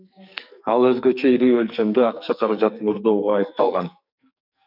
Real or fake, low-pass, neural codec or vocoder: fake; 5.4 kHz; codec, 44.1 kHz, 2.6 kbps, SNAC